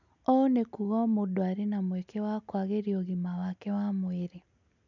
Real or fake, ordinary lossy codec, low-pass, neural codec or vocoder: real; none; 7.2 kHz; none